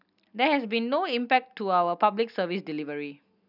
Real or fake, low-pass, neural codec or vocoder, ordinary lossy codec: real; 5.4 kHz; none; none